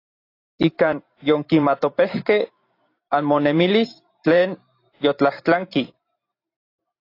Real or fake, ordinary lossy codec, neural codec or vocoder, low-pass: fake; AAC, 32 kbps; vocoder, 44.1 kHz, 128 mel bands every 512 samples, BigVGAN v2; 5.4 kHz